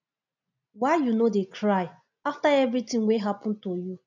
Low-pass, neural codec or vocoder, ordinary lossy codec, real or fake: 7.2 kHz; none; none; real